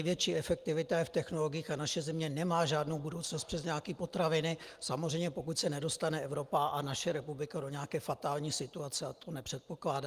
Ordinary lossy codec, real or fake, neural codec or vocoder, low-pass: Opus, 32 kbps; real; none; 14.4 kHz